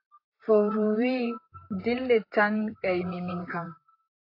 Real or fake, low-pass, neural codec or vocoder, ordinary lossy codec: fake; 5.4 kHz; vocoder, 44.1 kHz, 128 mel bands, Pupu-Vocoder; AAC, 32 kbps